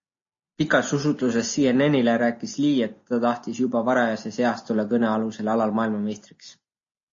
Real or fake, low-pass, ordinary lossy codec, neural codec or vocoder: real; 7.2 kHz; MP3, 32 kbps; none